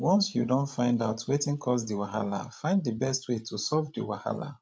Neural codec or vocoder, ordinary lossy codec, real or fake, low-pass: codec, 16 kHz, 16 kbps, FreqCodec, larger model; none; fake; none